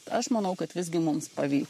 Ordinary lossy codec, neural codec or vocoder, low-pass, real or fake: MP3, 64 kbps; codec, 44.1 kHz, 7.8 kbps, Pupu-Codec; 14.4 kHz; fake